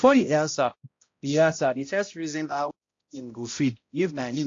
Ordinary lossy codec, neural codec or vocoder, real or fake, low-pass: AAC, 48 kbps; codec, 16 kHz, 0.5 kbps, X-Codec, HuBERT features, trained on balanced general audio; fake; 7.2 kHz